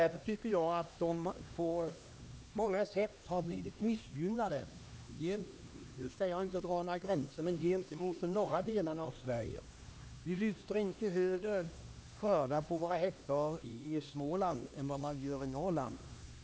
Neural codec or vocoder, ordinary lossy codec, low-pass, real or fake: codec, 16 kHz, 2 kbps, X-Codec, HuBERT features, trained on LibriSpeech; none; none; fake